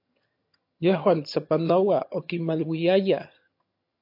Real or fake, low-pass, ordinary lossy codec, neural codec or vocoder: fake; 5.4 kHz; MP3, 32 kbps; vocoder, 22.05 kHz, 80 mel bands, HiFi-GAN